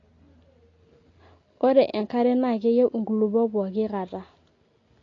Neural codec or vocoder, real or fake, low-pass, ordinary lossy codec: none; real; 7.2 kHz; AAC, 32 kbps